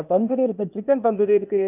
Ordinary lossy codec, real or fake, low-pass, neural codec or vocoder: none; fake; 3.6 kHz; codec, 16 kHz, 0.8 kbps, ZipCodec